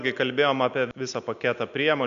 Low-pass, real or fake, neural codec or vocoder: 7.2 kHz; real; none